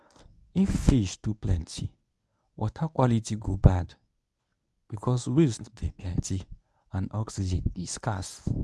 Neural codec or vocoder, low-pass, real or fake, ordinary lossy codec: codec, 24 kHz, 0.9 kbps, WavTokenizer, medium speech release version 1; none; fake; none